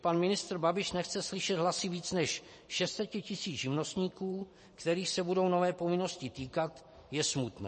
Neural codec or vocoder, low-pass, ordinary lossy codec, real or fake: vocoder, 44.1 kHz, 128 mel bands every 512 samples, BigVGAN v2; 10.8 kHz; MP3, 32 kbps; fake